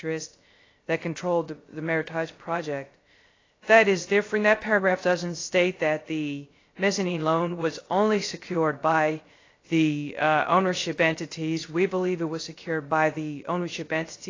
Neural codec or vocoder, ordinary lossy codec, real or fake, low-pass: codec, 16 kHz, 0.3 kbps, FocalCodec; AAC, 32 kbps; fake; 7.2 kHz